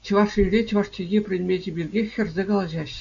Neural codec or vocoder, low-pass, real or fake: none; 7.2 kHz; real